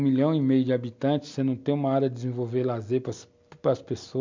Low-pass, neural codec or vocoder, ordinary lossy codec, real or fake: 7.2 kHz; none; MP3, 64 kbps; real